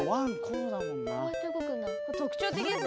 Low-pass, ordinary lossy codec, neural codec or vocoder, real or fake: none; none; none; real